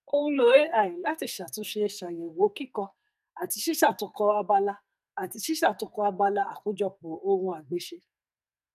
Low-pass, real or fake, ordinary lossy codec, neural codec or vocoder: 14.4 kHz; fake; none; codec, 44.1 kHz, 2.6 kbps, SNAC